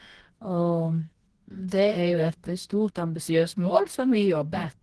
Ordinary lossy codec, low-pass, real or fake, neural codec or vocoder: Opus, 16 kbps; 10.8 kHz; fake; codec, 24 kHz, 0.9 kbps, WavTokenizer, medium music audio release